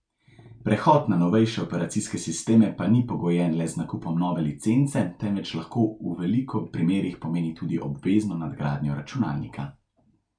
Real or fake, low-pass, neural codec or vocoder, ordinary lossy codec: real; 9.9 kHz; none; none